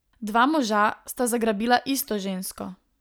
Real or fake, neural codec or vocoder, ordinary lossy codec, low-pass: real; none; none; none